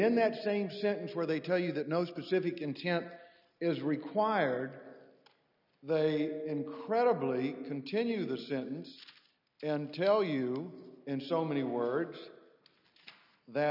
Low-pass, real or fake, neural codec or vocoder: 5.4 kHz; real; none